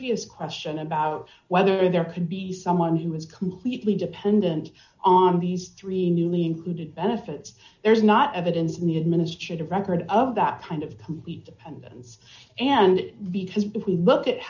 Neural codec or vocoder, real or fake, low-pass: none; real; 7.2 kHz